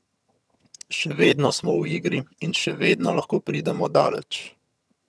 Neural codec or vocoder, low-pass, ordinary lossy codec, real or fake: vocoder, 22.05 kHz, 80 mel bands, HiFi-GAN; none; none; fake